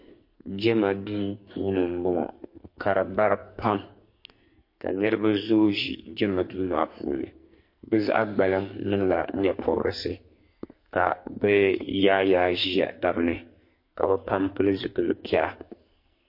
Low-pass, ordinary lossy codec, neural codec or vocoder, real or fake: 5.4 kHz; MP3, 32 kbps; codec, 44.1 kHz, 2.6 kbps, SNAC; fake